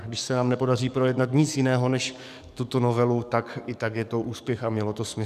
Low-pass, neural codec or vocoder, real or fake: 14.4 kHz; codec, 44.1 kHz, 7.8 kbps, DAC; fake